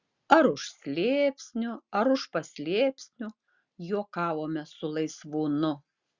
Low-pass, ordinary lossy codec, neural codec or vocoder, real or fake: 7.2 kHz; Opus, 64 kbps; none; real